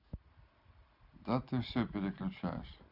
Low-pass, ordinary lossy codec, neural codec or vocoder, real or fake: 5.4 kHz; none; none; real